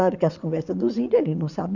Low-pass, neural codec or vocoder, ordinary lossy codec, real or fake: 7.2 kHz; vocoder, 22.05 kHz, 80 mel bands, WaveNeXt; none; fake